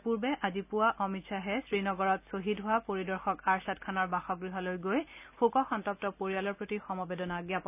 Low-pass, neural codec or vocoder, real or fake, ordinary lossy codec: 3.6 kHz; none; real; none